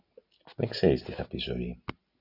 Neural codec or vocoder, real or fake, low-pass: none; real; 5.4 kHz